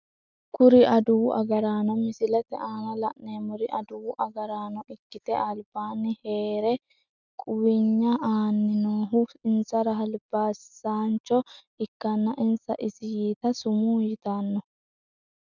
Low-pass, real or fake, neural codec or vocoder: 7.2 kHz; real; none